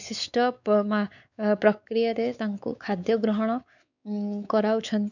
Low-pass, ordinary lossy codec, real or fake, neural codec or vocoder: 7.2 kHz; none; fake; codec, 16 kHz, 4 kbps, X-Codec, WavLM features, trained on Multilingual LibriSpeech